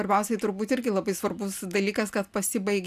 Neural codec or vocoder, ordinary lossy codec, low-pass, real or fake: none; AAC, 96 kbps; 14.4 kHz; real